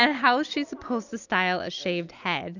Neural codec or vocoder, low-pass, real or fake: none; 7.2 kHz; real